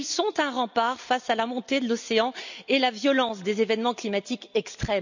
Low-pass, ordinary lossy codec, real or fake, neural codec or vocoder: 7.2 kHz; none; real; none